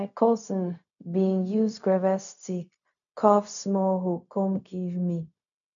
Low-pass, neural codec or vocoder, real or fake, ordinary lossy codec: 7.2 kHz; codec, 16 kHz, 0.4 kbps, LongCat-Audio-Codec; fake; none